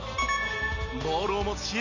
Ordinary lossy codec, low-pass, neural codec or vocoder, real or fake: none; 7.2 kHz; none; real